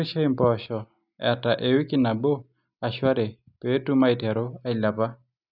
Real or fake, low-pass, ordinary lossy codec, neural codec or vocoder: real; 5.4 kHz; none; none